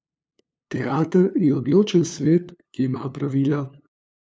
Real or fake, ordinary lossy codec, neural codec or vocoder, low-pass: fake; none; codec, 16 kHz, 2 kbps, FunCodec, trained on LibriTTS, 25 frames a second; none